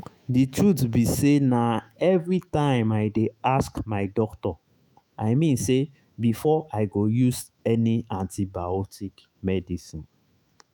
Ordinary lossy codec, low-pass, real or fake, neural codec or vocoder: none; none; fake; autoencoder, 48 kHz, 128 numbers a frame, DAC-VAE, trained on Japanese speech